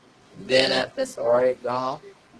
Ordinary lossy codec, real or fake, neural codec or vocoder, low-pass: Opus, 16 kbps; fake; codec, 24 kHz, 0.9 kbps, WavTokenizer, medium music audio release; 10.8 kHz